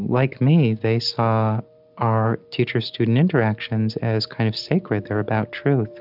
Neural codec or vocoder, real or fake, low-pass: none; real; 5.4 kHz